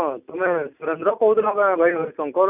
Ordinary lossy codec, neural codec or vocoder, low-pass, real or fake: none; none; 3.6 kHz; real